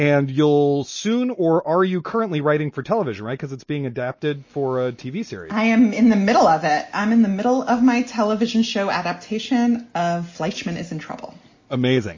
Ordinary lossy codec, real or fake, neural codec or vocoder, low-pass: MP3, 32 kbps; real; none; 7.2 kHz